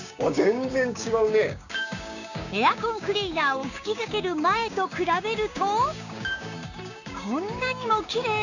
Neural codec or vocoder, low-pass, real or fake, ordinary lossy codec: codec, 16 kHz, 6 kbps, DAC; 7.2 kHz; fake; none